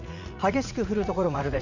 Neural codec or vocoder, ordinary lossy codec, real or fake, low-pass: vocoder, 44.1 kHz, 80 mel bands, Vocos; none; fake; 7.2 kHz